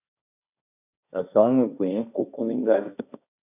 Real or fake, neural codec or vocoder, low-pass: fake; codec, 16 kHz, 1.1 kbps, Voila-Tokenizer; 3.6 kHz